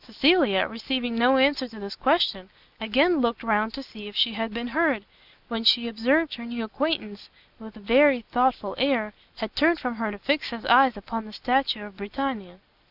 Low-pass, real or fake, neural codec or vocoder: 5.4 kHz; real; none